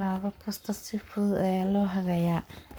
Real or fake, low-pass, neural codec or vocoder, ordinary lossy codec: fake; none; codec, 44.1 kHz, 7.8 kbps, Pupu-Codec; none